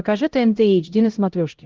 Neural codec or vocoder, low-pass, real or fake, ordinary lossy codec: codec, 16 kHz, 0.5 kbps, X-Codec, HuBERT features, trained on LibriSpeech; 7.2 kHz; fake; Opus, 16 kbps